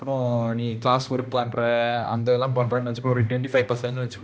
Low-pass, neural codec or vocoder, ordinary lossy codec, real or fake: none; codec, 16 kHz, 1 kbps, X-Codec, HuBERT features, trained on balanced general audio; none; fake